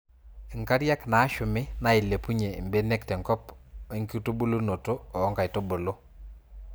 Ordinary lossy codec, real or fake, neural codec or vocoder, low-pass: none; real; none; none